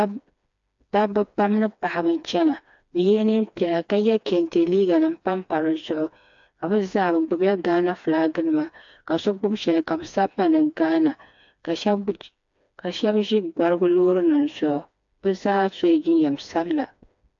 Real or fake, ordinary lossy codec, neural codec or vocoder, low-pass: fake; AAC, 64 kbps; codec, 16 kHz, 2 kbps, FreqCodec, smaller model; 7.2 kHz